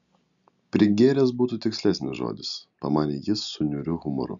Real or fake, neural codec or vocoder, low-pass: real; none; 7.2 kHz